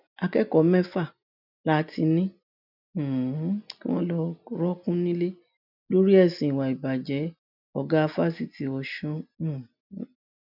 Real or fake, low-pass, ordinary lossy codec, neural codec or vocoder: real; 5.4 kHz; none; none